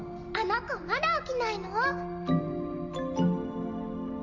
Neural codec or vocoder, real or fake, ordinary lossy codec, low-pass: none; real; none; 7.2 kHz